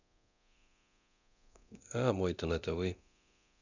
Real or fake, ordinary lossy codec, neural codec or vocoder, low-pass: fake; none; codec, 24 kHz, 0.9 kbps, DualCodec; 7.2 kHz